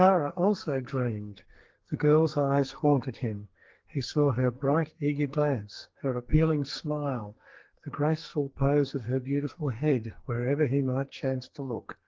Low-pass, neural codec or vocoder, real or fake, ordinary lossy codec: 7.2 kHz; codec, 44.1 kHz, 2.6 kbps, SNAC; fake; Opus, 16 kbps